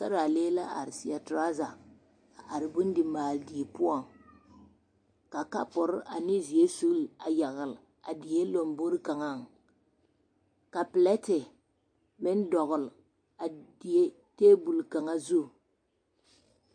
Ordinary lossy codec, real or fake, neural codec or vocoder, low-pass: MP3, 48 kbps; real; none; 9.9 kHz